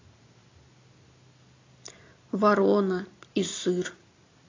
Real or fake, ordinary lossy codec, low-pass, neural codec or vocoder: real; AAC, 32 kbps; 7.2 kHz; none